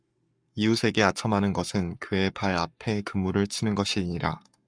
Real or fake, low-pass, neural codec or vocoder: fake; 9.9 kHz; codec, 44.1 kHz, 7.8 kbps, Pupu-Codec